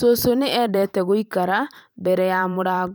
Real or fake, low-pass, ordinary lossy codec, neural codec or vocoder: fake; none; none; vocoder, 44.1 kHz, 128 mel bands every 512 samples, BigVGAN v2